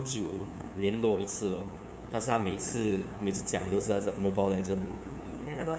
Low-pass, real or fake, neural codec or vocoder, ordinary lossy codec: none; fake; codec, 16 kHz, 2 kbps, FunCodec, trained on LibriTTS, 25 frames a second; none